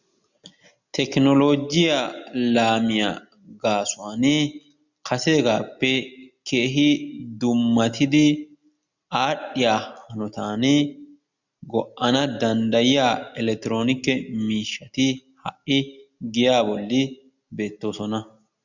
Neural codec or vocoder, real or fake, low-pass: none; real; 7.2 kHz